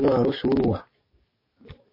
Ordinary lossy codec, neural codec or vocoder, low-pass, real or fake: MP3, 24 kbps; codec, 44.1 kHz, 7.8 kbps, DAC; 5.4 kHz; fake